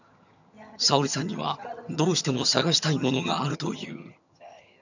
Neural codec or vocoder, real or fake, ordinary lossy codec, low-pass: vocoder, 22.05 kHz, 80 mel bands, HiFi-GAN; fake; none; 7.2 kHz